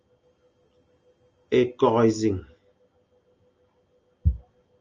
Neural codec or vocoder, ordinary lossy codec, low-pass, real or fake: none; Opus, 24 kbps; 7.2 kHz; real